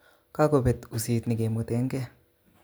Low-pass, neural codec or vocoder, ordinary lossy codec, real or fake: none; none; none; real